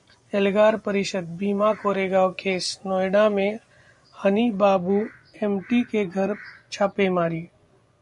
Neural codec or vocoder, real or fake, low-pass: vocoder, 24 kHz, 100 mel bands, Vocos; fake; 10.8 kHz